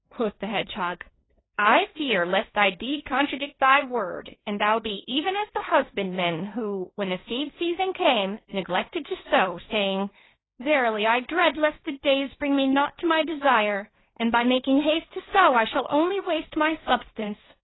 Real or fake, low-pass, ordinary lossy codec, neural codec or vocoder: fake; 7.2 kHz; AAC, 16 kbps; codec, 16 kHz, 1.1 kbps, Voila-Tokenizer